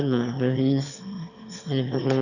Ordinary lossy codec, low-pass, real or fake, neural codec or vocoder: none; 7.2 kHz; fake; autoencoder, 22.05 kHz, a latent of 192 numbers a frame, VITS, trained on one speaker